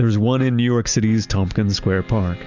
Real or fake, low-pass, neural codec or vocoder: real; 7.2 kHz; none